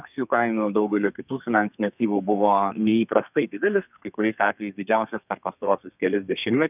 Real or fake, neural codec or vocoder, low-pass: fake; codec, 32 kHz, 1.9 kbps, SNAC; 3.6 kHz